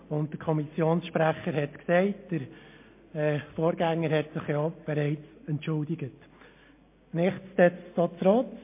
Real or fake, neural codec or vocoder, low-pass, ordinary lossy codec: real; none; 3.6 kHz; MP3, 24 kbps